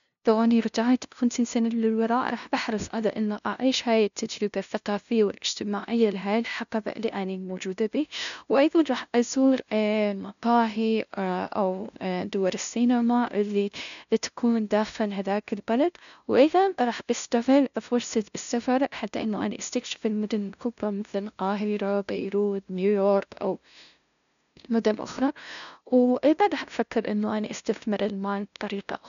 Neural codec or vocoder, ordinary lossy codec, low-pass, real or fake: codec, 16 kHz, 0.5 kbps, FunCodec, trained on LibriTTS, 25 frames a second; none; 7.2 kHz; fake